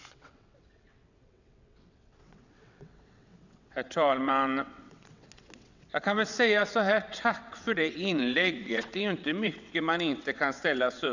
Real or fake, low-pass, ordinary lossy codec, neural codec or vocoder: fake; 7.2 kHz; none; vocoder, 22.05 kHz, 80 mel bands, WaveNeXt